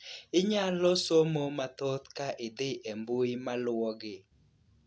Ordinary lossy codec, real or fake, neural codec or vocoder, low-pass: none; real; none; none